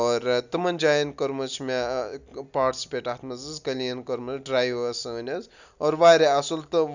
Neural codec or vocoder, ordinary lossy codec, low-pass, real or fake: none; none; 7.2 kHz; real